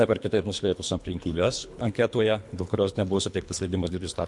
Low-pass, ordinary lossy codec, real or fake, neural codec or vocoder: 10.8 kHz; MP3, 64 kbps; fake; codec, 24 kHz, 3 kbps, HILCodec